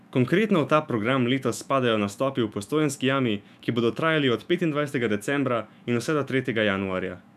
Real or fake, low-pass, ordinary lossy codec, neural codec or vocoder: fake; 14.4 kHz; none; autoencoder, 48 kHz, 128 numbers a frame, DAC-VAE, trained on Japanese speech